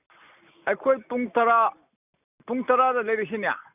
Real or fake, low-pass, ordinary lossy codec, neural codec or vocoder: real; 3.6 kHz; none; none